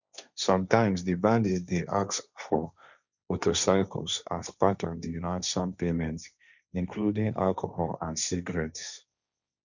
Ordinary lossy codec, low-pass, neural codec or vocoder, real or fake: none; 7.2 kHz; codec, 16 kHz, 1.1 kbps, Voila-Tokenizer; fake